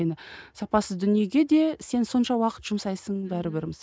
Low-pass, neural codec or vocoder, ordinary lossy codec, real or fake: none; none; none; real